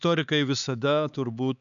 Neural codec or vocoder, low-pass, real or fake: codec, 16 kHz, 4 kbps, X-Codec, HuBERT features, trained on LibriSpeech; 7.2 kHz; fake